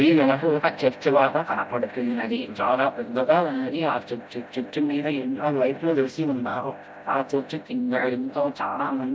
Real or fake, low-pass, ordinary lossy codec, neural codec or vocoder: fake; none; none; codec, 16 kHz, 0.5 kbps, FreqCodec, smaller model